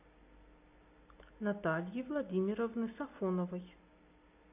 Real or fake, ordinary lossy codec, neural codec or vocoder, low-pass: real; AAC, 32 kbps; none; 3.6 kHz